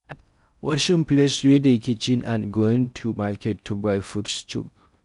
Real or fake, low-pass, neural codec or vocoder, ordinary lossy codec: fake; 10.8 kHz; codec, 16 kHz in and 24 kHz out, 0.6 kbps, FocalCodec, streaming, 4096 codes; none